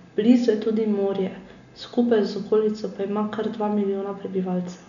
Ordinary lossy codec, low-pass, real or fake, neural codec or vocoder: none; 7.2 kHz; real; none